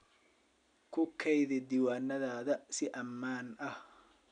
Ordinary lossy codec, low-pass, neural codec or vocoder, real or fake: none; 9.9 kHz; none; real